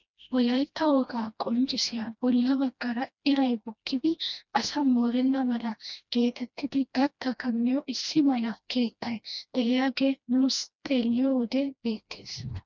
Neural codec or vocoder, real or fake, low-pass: codec, 16 kHz, 1 kbps, FreqCodec, smaller model; fake; 7.2 kHz